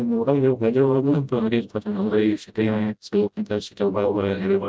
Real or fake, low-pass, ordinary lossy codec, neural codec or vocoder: fake; none; none; codec, 16 kHz, 0.5 kbps, FreqCodec, smaller model